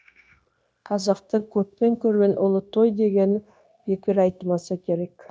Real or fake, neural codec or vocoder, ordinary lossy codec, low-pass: fake; codec, 16 kHz, 0.9 kbps, LongCat-Audio-Codec; none; none